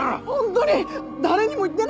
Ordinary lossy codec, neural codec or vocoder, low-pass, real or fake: none; none; none; real